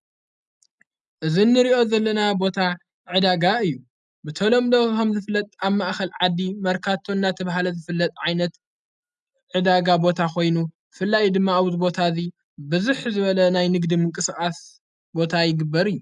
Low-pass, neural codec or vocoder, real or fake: 10.8 kHz; none; real